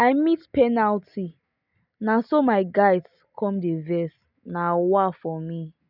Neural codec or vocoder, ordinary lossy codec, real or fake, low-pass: none; none; real; 5.4 kHz